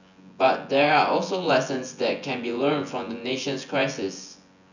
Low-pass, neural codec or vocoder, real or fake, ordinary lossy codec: 7.2 kHz; vocoder, 24 kHz, 100 mel bands, Vocos; fake; none